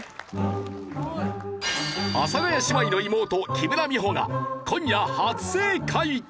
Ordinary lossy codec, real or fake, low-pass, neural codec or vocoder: none; real; none; none